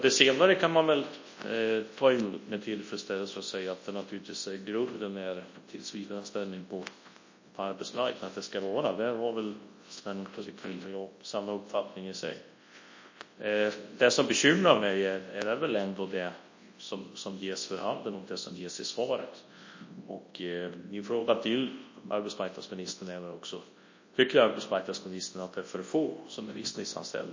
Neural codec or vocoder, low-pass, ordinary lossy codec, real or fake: codec, 24 kHz, 0.9 kbps, WavTokenizer, large speech release; 7.2 kHz; MP3, 32 kbps; fake